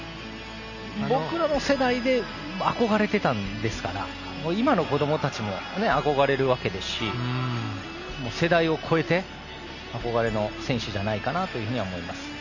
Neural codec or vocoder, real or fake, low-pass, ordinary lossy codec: none; real; 7.2 kHz; none